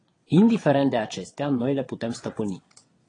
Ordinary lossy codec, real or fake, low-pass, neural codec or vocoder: AAC, 32 kbps; fake; 9.9 kHz; vocoder, 22.05 kHz, 80 mel bands, WaveNeXt